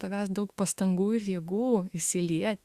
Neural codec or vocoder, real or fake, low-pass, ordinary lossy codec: autoencoder, 48 kHz, 32 numbers a frame, DAC-VAE, trained on Japanese speech; fake; 14.4 kHz; Opus, 64 kbps